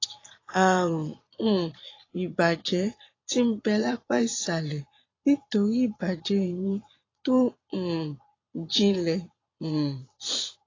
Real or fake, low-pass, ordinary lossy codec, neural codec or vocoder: fake; 7.2 kHz; AAC, 32 kbps; vocoder, 22.05 kHz, 80 mel bands, Vocos